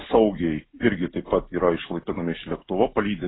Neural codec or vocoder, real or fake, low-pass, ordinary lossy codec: none; real; 7.2 kHz; AAC, 16 kbps